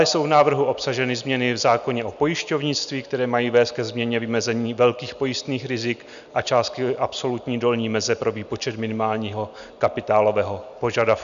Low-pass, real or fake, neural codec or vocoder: 7.2 kHz; real; none